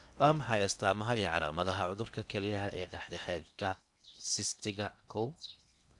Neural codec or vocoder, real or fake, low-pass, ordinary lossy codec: codec, 16 kHz in and 24 kHz out, 0.8 kbps, FocalCodec, streaming, 65536 codes; fake; 10.8 kHz; none